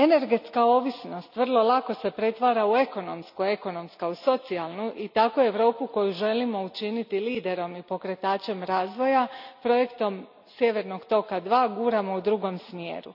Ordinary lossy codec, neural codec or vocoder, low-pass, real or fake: none; none; 5.4 kHz; real